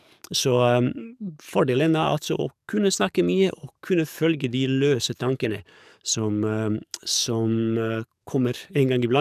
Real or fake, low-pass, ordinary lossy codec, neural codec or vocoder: fake; 14.4 kHz; none; codec, 44.1 kHz, 7.8 kbps, DAC